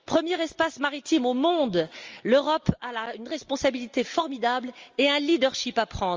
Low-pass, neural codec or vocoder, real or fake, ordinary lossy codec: 7.2 kHz; none; real; Opus, 32 kbps